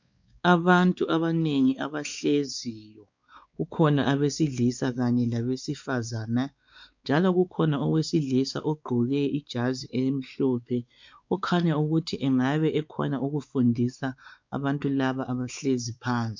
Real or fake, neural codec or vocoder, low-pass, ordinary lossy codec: fake; codec, 16 kHz, 4 kbps, X-Codec, WavLM features, trained on Multilingual LibriSpeech; 7.2 kHz; MP3, 64 kbps